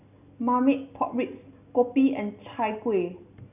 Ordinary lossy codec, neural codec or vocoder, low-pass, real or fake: none; none; 3.6 kHz; real